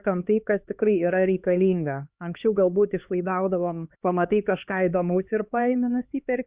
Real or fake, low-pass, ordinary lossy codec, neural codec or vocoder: fake; 3.6 kHz; Opus, 64 kbps; codec, 16 kHz, 2 kbps, X-Codec, HuBERT features, trained on LibriSpeech